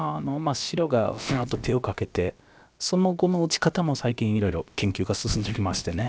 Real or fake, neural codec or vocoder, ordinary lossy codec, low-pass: fake; codec, 16 kHz, about 1 kbps, DyCAST, with the encoder's durations; none; none